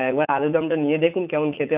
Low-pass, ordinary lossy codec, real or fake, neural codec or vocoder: 3.6 kHz; none; fake; vocoder, 44.1 kHz, 80 mel bands, Vocos